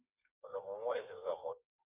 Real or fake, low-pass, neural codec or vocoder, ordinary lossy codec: fake; 3.6 kHz; codec, 16 kHz, 4 kbps, FreqCodec, smaller model; Opus, 64 kbps